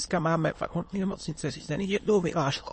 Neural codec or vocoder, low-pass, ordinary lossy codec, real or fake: autoencoder, 22.05 kHz, a latent of 192 numbers a frame, VITS, trained on many speakers; 9.9 kHz; MP3, 32 kbps; fake